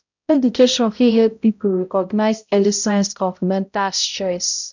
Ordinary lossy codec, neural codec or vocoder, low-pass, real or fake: none; codec, 16 kHz, 0.5 kbps, X-Codec, HuBERT features, trained on balanced general audio; 7.2 kHz; fake